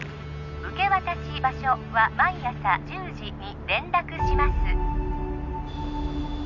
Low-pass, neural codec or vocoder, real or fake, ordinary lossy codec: 7.2 kHz; none; real; none